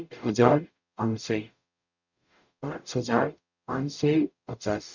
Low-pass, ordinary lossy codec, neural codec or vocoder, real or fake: 7.2 kHz; none; codec, 44.1 kHz, 0.9 kbps, DAC; fake